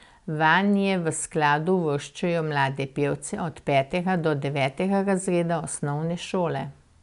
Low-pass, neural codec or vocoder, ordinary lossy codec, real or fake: 10.8 kHz; none; none; real